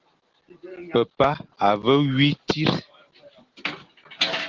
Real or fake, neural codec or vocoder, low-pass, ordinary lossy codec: real; none; 7.2 kHz; Opus, 16 kbps